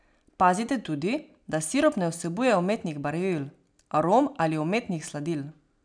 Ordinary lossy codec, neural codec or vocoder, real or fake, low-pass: none; none; real; 9.9 kHz